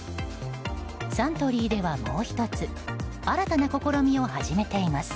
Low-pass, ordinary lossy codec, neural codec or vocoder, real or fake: none; none; none; real